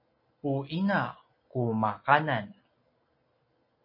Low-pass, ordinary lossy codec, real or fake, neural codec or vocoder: 5.4 kHz; MP3, 24 kbps; real; none